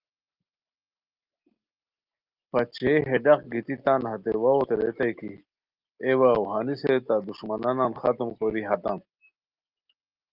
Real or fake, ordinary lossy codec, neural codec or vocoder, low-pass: real; Opus, 24 kbps; none; 5.4 kHz